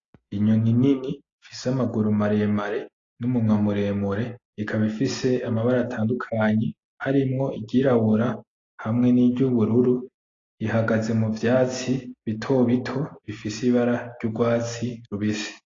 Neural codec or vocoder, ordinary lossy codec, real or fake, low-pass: none; AAC, 32 kbps; real; 7.2 kHz